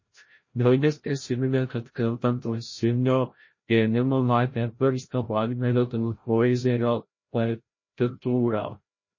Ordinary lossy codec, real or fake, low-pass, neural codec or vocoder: MP3, 32 kbps; fake; 7.2 kHz; codec, 16 kHz, 0.5 kbps, FreqCodec, larger model